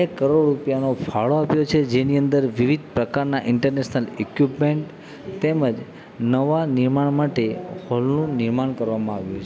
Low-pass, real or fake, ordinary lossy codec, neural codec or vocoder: none; real; none; none